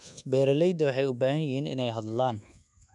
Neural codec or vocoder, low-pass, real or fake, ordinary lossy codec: codec, 24 kHz, 1.2 kbps, DualCodec; 10.8 kHz; fake; none